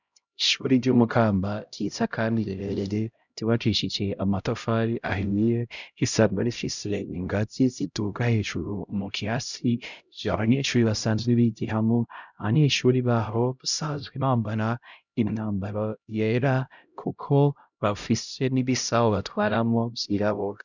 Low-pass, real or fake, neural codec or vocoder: 7.2 kHz; fake; codec, 16 kHz, 0.5 kbps, X-Codec, HuBERT features, trained on LibriSpeech